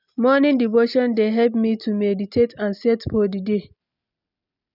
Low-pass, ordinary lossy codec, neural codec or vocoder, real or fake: 5.4 kHz; none; none; real